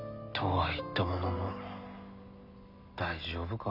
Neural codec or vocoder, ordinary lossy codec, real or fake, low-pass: none; none; real; 5.4 kHz